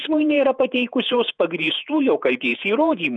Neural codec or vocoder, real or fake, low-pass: vocoder, 48 kHz, 128 mel bands, Vocos; fake; 9.9 kHz